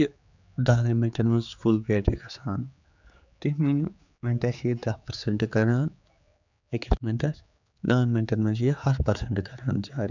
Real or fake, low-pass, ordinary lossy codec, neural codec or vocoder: fake; 7.2 kHz; none; codec, 16 kHz, 4 kbps, X-Codec, HuBERT features, trained on general audio